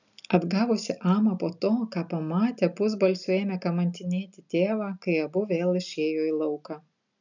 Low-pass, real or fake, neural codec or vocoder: 7.2 kHz; real; none